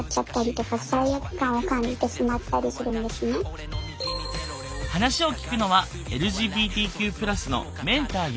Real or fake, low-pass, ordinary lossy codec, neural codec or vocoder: real; none; none; none